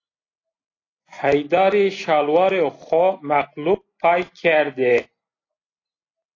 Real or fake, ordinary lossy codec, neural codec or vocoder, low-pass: real; AAC, 32 kbps; none; 7.2 kHz